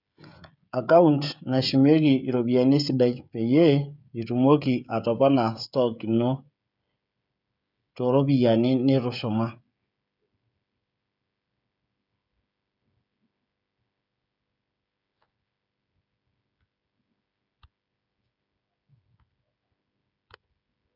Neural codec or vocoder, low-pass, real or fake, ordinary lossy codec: codec, 16 kHz, 16 kbps, FreqCodec, smaller model; 5.4 kHz; fake; none